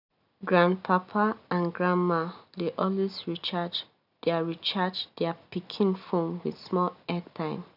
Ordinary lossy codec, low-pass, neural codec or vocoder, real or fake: none; 5.4 kHz; none; real